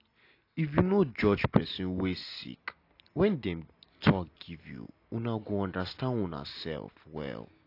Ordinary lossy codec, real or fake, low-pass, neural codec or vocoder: AAC, 32 kbps; real; 5.4 kHz; none